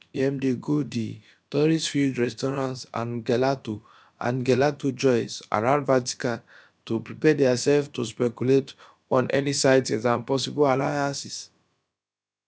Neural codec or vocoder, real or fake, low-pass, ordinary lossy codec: codec, 16 kHz, about 1 kbps, DyCAST, with the encoder's durations; fake; none; none